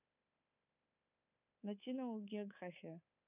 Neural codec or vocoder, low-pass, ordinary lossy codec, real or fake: codec, 24 kHz, 3.1 kbps, DualCodec; 3.6 kHz; none; fake